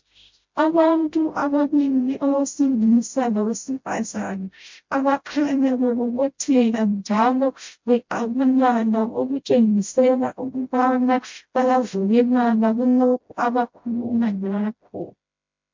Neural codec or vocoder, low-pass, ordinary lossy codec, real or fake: codec, 16 kHz, 0.5 kbps, FreqCodec, smaller model; 7.2 kHz; MP3, 48 kbps; fake